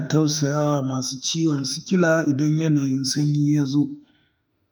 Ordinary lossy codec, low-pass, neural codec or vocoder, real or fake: none; none; autoencoder, 48 kHz, 32 numbers a frame, DAC-VAE, trained on Japanese speech; fake